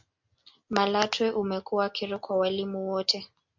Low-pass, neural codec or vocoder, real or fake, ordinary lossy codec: 7.2 kHz; none; real; MP3, 64 kbps